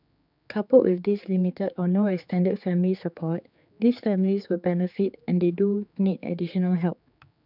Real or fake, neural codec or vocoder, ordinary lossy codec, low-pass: fake; codec, 16 kHz, 4 kbps, X-Codec, HuBERT features, trained on general audio; none; 5.4 kHz